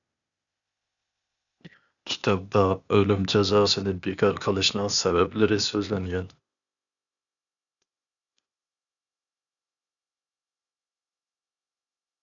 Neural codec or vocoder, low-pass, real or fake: codec, 16 kHz, 0.8 kbps, ZipCodec; 7.2 kHz; fake